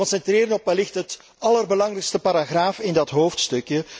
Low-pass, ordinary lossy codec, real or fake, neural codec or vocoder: none; none; real; none